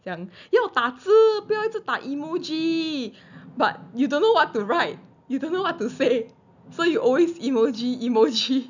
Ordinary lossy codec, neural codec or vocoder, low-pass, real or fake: none; none; 7.2 kHz; real